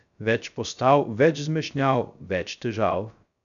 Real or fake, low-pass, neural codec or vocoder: fake; 7.2 kHz; codec, 16 kHz, 0.3 kbps, FocalCodec